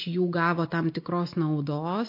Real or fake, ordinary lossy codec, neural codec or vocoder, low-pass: real; MP3, 32 kbps; none; 5.4 kHz